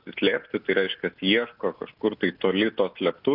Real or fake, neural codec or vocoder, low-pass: real; none; 5.4 kHz